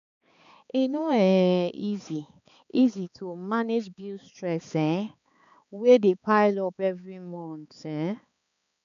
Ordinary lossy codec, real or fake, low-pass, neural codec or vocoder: none; fake; 7.2 kHz; codec, 16 kHz, 4 kbps, X-Codec, HuBERT features, trained on balanced general audio